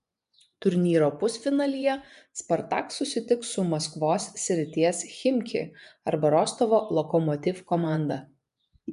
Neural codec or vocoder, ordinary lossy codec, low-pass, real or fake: vocoder, 24 kHz, 100 mel bands, Vocos; MP3, 96 kbps; 10.8 kHz; fake